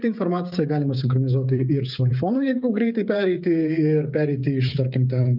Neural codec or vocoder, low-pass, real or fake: codec, 16 kHz, 8 kbps, FreqCodec, smaller model; 5.4 kHz; fake